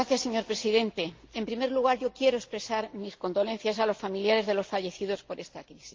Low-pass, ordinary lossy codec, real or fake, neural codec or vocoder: 7.2 kHz; Opus, 32 kbps; real; none